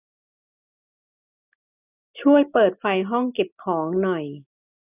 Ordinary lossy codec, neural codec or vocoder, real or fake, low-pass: none; none; real; 3.6 kHz